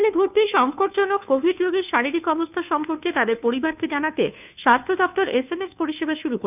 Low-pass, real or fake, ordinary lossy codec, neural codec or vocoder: 3.6 kHz; fake; none; codec, 16 kHz, 2 kbps, FunCodec, trained on Chinese and English, 25 frames a second